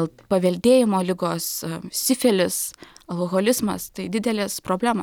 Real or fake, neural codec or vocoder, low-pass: real; none; 19.8 kHz